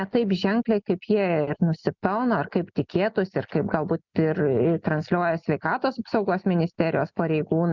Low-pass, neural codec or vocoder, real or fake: 7.2 kHz; none; real